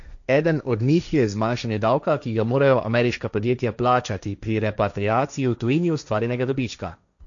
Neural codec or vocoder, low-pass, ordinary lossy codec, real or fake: codec, 16 kHz, 1.1 kbps, Voila-Tokenizer; 7.2 kHz; none; fake